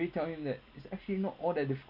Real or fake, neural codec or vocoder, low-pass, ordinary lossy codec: real; none; 5.4 kHz; none